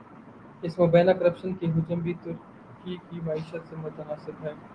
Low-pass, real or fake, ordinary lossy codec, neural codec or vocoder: 9.9 kHz; real; Opus, 24 kbps; none